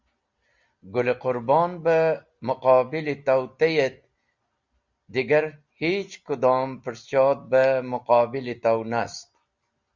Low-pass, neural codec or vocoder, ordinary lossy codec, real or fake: 7.2 kHz; none; Opus, 64 kbps; real